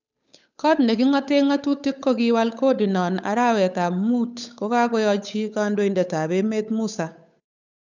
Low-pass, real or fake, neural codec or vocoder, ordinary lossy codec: 7.2 kHz; fake; codec, 16 kHz, 8 kbps, FunCodec, trained on Chinese and English, 25 frames a second; none